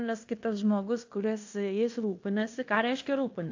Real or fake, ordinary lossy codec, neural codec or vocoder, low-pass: fake; AAC, 48 kbps; codec, 16 kHz in and 24 kHz out, 0.9 kbps, LongCat-Audio-Codec, fine tuned four codebook decoder; 7.2 kHz